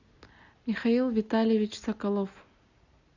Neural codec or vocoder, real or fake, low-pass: none; real; 7.2 kHz